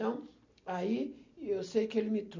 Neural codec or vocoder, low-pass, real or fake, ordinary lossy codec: none; 7.2 kHz; real; MP3, 64 kbps